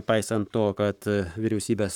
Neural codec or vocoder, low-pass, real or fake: codec, 44.1 kHz, 7.8 kbps, Pupu-Codec; 19.8 kHz; fake